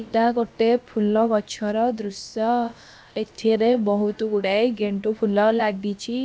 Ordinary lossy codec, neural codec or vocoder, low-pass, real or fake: none; codec, 16 kHz, about 1 kbps, DyCAST, with the encoder's durations; none; fake